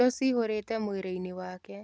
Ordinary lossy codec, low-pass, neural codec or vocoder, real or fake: none; none; none; real